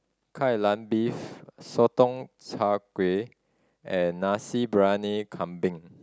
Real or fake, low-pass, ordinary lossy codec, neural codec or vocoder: real; none; none; none